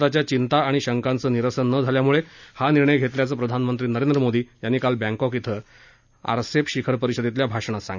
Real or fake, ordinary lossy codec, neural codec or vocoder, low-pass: real; none; none; 7.2 kHz